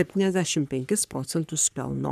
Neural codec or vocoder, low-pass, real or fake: codec, 44.1 kHz, 3.4 kbps, Pupu-Codec; 14.4 kHz; fake